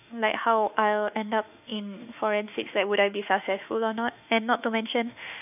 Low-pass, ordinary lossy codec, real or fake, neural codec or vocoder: 3.6 kHz; none; fake; autoencoder, 48 kHz, 32 numbers a frame, DAC-VAE, trained on Japanese speech